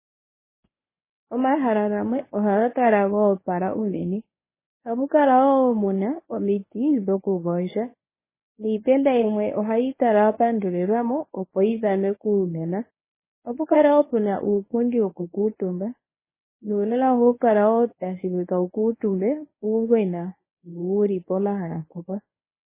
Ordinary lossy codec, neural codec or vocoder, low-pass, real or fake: MP3, 16 kbps; codec, 24 kHz, 0.9 kbps, WavTokenizer, medium speech release version 1; 3.6 kHz; fake